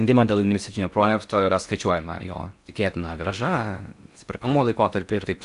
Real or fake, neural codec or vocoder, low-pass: fake; codec, 16 kHz in and 24 kHz out, 0.6 kbps, FocalCodec, streaming, 4096 codes; 10.8 kHz